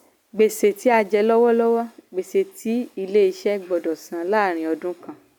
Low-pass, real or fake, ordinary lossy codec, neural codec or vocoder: 19.8 kHz; real; none; none